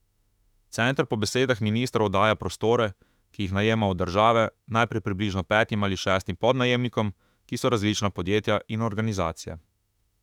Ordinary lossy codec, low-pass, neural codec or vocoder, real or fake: none; 19.8 kHz; autoencoder, 48 kHz, 32 numbers a frame, DAC-VAE, trained on Japanese speech; fake